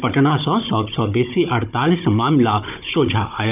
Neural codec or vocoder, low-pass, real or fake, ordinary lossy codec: codec, 16 kHz, 16 kbps, FunCodec, trained on Chinese and English, 50 frames a second; 3.6 kHz; fake; none